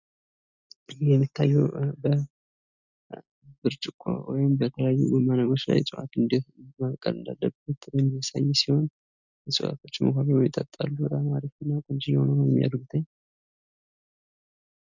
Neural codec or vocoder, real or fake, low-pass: none; real; 7.2 kHz